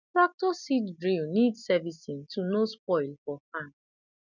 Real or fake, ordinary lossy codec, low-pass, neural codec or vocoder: real; none; 7.2 kHz; none